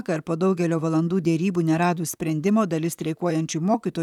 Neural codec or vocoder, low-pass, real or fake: vocoder, 44.1 kHz, 128 mel bands, Pupu-Vocoder; 19.8 kHz; fake